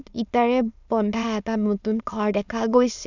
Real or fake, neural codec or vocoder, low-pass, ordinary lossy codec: fake; autoencoder, 22.05 kHz, a latent of 192 numbers a frame, VITS, trained on many speakers; 7.2 kHz; none